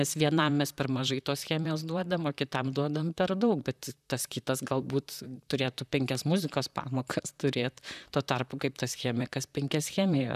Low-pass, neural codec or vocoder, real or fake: 14.4 kHz; vocoder, 44.1 kHz, 128 mel bands, Pupu-Vocoder; fake